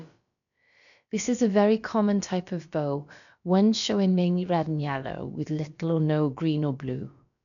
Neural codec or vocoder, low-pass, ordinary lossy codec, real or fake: codec, 16 kHz, about 1 kbps, DyCAST, with the encoder's durations; 7.2 kHz; none; fake